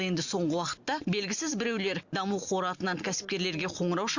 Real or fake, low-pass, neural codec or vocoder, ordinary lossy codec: real; 7.2 kHz; none; Opus, 64 kbps